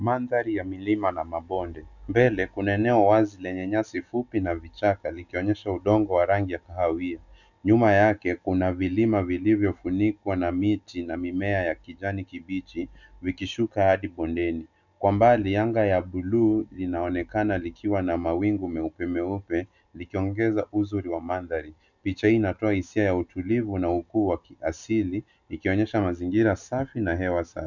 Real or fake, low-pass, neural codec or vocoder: real; 7.2 kHz; none